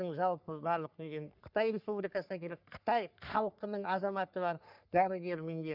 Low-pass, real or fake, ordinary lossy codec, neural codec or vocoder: 5.4 kHz; fake; none; codec, 44.1 kHz, 3.4 kbps, Pupu-Codec